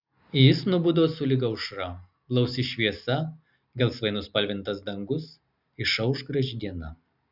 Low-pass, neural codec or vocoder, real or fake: 5.4 kHz; none; real